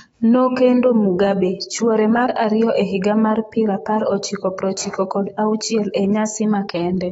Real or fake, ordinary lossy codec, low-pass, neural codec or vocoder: fake; AAC, 24 kbps; 19.8 kHz; autoencoder, 48 kHz, 128 numbers a frame, DAC-VAE, trained on Japanese speech